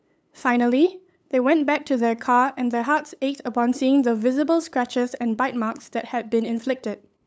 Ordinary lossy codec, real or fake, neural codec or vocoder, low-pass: none; fake; codec, 16 kHz, 8 kbps, FunCodec, trained on LibriTTS, 25 frames a second; none